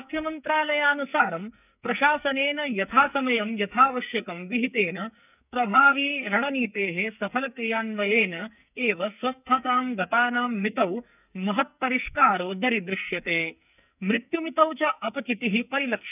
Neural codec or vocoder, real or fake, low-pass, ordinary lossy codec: codec, 44.1 kHz, 2.6 kbps, SNAC; fake; 3.6 kHz; none